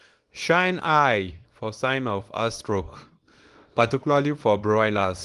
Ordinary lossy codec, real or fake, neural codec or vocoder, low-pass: Opus, 24 kbps; fake; codec, 24 kHz, 0.9 kbps, WavTokenizer, small release; 10.8 kHz